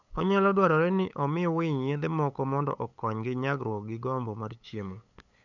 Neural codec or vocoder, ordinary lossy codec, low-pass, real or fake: codec, 16 kHz, 8 kbps, FunCodec, trained on LibriTTS, 25 frames a second; none; 7.2 kHz; fake